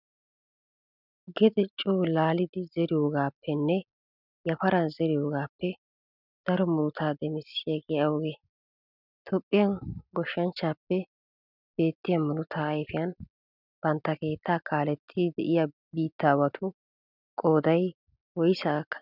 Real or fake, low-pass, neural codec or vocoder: real; 5.4 kHz; none